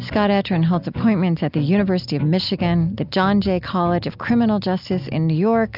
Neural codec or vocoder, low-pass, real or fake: none; 5.4 kHz; real